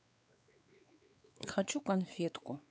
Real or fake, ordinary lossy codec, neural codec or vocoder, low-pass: fake; none; codec, 16 kHz, 4 kbps, X-Codec, WavLM features, trained on Multilingual LibriSpeech; none